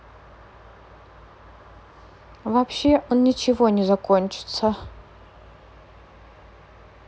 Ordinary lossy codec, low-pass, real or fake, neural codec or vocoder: none; none; real; none